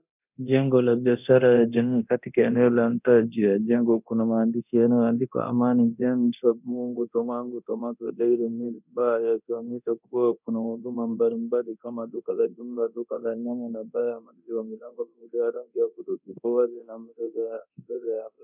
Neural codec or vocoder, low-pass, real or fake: codec, 24 kHz, 0.9 kbps, DualCodec; 3.6 kHz; fake